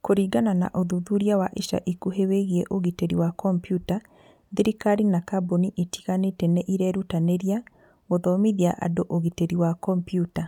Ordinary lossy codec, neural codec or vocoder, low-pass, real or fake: none; none; 19.8 kHz; real